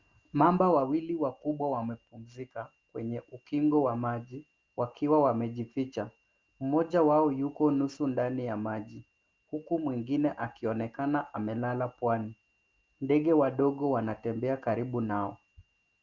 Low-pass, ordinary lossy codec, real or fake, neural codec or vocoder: 7.2 kHz; Opus, 32 kbps; real; none